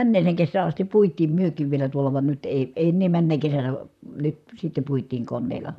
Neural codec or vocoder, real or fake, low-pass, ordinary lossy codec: vocoder, 44.1 kHz, 128 mel bands, Pupu-Vocoder; fake; 14.4 kHz; none